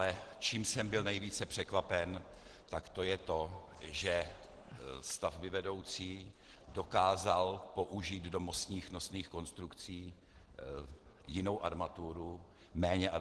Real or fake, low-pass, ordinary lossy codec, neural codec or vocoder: real; 10.8 kHz; Opus, 16 kbps; none